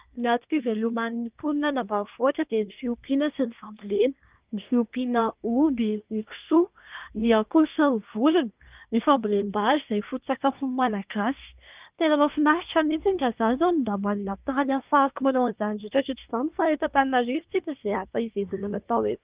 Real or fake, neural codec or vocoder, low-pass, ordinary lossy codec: fake; codec, 24 kHz, 1 kbps, SNAC; 3.6 kHz; Opus, 24 kbps